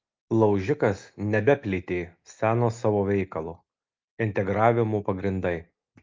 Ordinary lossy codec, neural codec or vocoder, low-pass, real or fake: Opus, 24 kbps; none; 7.2 kHz; real